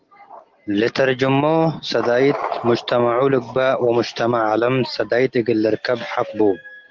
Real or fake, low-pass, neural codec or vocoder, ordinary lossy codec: real; 7.2 kHz; none; Opus, 16 kbps